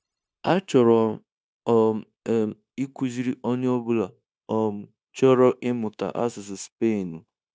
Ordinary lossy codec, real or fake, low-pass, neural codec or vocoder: none; fake; none; codec, 16 kHz, 0.9 kbps, LongCat-Audio-Codec